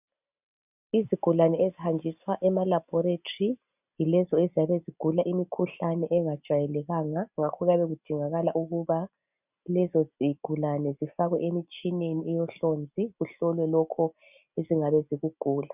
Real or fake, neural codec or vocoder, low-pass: real; none; 3.6 kHz